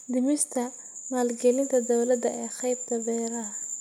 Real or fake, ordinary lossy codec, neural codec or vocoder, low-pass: real; none; none; 19.8 kHz